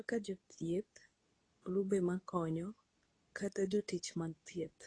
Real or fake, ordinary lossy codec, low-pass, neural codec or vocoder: fake; AAC, 32 kbps; 9.9 kHz; codec, 24 kHz, 0.9 kbps, WavTokenizer, medium speech release version 2